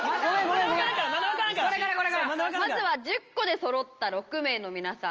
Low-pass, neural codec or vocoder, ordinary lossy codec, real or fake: 7.2 kHz; none; Opus, 24 kbps; real